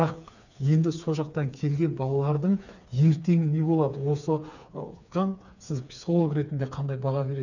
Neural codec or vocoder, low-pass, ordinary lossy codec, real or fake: codec, 16 kHz, 4 kbps, FreqCodec, smaller model; 7.2 kHz; none; fake